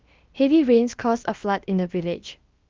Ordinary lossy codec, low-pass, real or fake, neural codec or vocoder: Opus, 24 kbps; 7.2 kHz; fake; codec, 16 kHz, about 1 kbps, DyCAST, with the encoder's durations